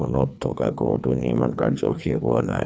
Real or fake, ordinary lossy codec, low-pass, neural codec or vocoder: fake; none; none; codec, 16 kHz, 2 kbps, FreqCodec, larger model